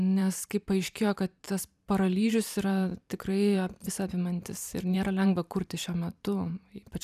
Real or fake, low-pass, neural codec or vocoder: fake; 14.4 kHz; vocoder, 44.1 kHz, 128 mel bands every 512 samples, BigVGAN v2